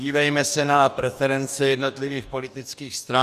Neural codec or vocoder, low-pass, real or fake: codec, 44.1 kHz, 2.6 kbps, DAC; 14.4 kHz; fake